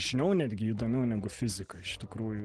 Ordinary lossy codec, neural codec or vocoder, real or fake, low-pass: Opus, 16 kbps; none; real; 9.9 kHz